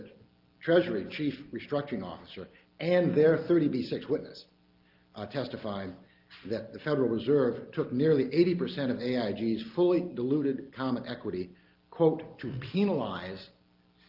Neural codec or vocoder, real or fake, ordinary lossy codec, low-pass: none; real; Opus, 32 kbps; 5.4 kHz